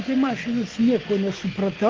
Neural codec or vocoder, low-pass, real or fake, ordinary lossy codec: none; 7.2 kHz; real; Opus, 16 kbps